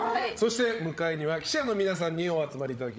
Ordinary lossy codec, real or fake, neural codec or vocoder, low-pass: none; fake; codec, 16 kHz, 16 kbps, FreqCodec, larger model; none